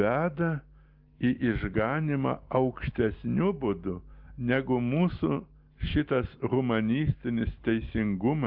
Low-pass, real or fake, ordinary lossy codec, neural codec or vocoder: 5.4 kHz; real; Opus, 24 kbps; none